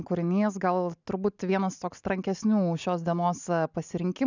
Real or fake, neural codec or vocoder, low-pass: real; none; 7.2 kHz